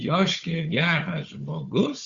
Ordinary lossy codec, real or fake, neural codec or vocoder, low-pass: Opus, 64 kbps; fake; codec, 16 kHz, 4 kbps, FunCodec, trained on Chinese and English, 50 frames a second; 7.2 kHz